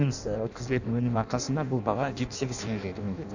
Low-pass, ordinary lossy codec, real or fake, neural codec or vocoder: 7.2 kHz; none; fake; codec, 16 kHz in and 24 kHz out, 0.6 kbps, FireRedTTS-2 codec